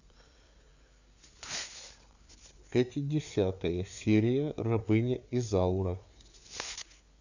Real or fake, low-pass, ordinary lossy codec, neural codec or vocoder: fake; 7.2 kHz; none; codec, 16 kHz, 4 kbps, FreqCodec, larger model